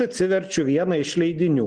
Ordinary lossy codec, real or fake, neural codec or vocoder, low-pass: Opus, 16 kbps; real; none; 9.9 kHz